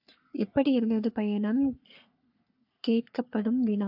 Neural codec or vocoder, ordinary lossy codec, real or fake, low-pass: codec, 44.1 kHz, 3.4 kbps, Pupu-Codec; AAC, 48 kbps; fake; 5.4 kHz